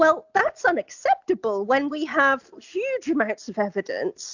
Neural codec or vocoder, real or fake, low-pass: none; real; 7.2 kHz